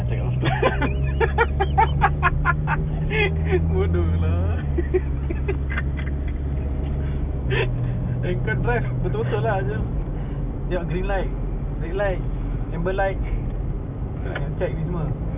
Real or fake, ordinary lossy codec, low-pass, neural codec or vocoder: real; none; 3.6 kHz; none